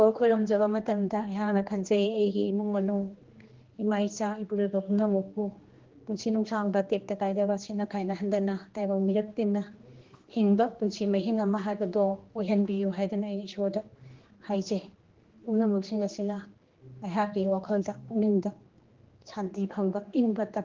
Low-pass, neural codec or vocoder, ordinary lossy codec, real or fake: 7.2 kHz; codec, 16 kHz, 2 kbps, X-Codec, HuBERT features, trained on general audio; Opus, 16 kbps; fake